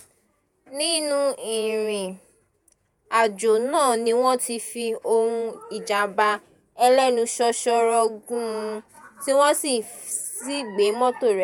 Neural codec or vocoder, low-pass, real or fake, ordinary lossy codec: vocoder, 48 kHz, 128 mel bands, Vocos; none; fake; none